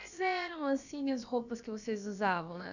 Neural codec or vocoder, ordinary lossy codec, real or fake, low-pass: codec, 16 kHz, about 1 kbps, DyCAST, with the encoder's durations; none; fake; 7.2 kHz